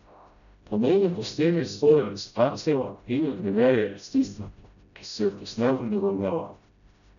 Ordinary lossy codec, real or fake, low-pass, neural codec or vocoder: none; fake; 7.2 kHz; codec, 16 kHz, 0.5 kbps, FreqCodec, smaller model